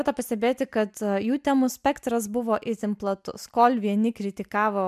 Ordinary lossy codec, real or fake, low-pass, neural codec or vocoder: MP3, 96 kbps; real; 14.4 kHz; none